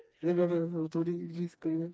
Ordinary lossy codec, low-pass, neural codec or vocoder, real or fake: none; none; codec, 16 kHz, 2 kbps, FreqCodec, smaller model; fake